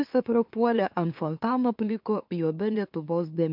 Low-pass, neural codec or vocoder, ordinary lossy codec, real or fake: 5.4 kHz; autoencoder, 44.1 kHz, a latent of 192 numbers a frame, MeloTTS; MP3, 48 kbps; fake